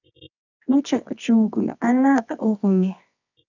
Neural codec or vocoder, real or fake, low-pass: codec, 24 kHz, 0.9 kbps, WavTokenizer, medium music audio release; fake; 7.2 kHz